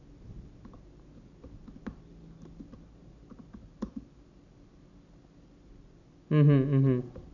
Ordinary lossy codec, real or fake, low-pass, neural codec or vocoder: none; real; 7.2 kHz; none